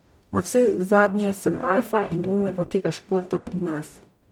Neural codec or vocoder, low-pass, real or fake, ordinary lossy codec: codec, 44.1 kHz, 0.9 kbps, DAC; 19.8 kHz; fake; MP3, 96 kbps